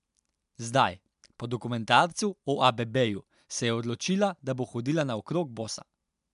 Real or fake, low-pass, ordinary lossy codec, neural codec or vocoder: real; 10.8 kHz; MP3, 96 kbps; none